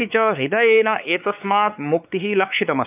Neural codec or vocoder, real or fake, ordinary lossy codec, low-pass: codec, 16 kHz, 4 kbps, X-Codec, WavLM features, trained on Multilingual LibriSpeech; fake; none; 3.6 kHz